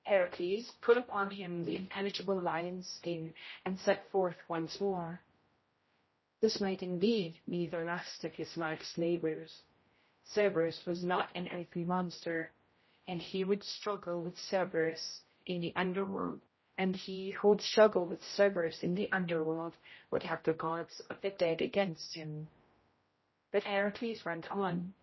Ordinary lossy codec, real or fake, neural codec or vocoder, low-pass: MP3, 24 kbps; fake; codec, 16 kHz, 0.5 kbps, X-Codec, HuBERT features, trained on general audio; 7.2 kHz